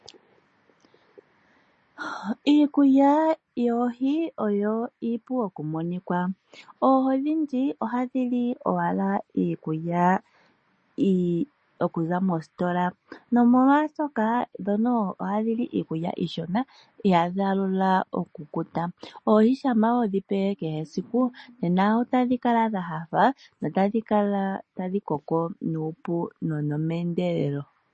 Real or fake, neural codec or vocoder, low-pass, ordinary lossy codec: real; none; 10.8 kHz; MP3, 32 kbps